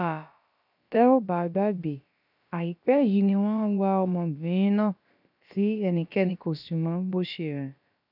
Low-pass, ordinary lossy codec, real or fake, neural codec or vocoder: 5.4 kHz; none; fake; codec, 16 kHz, about 1 kbps, DyCAST, with the encoder's durations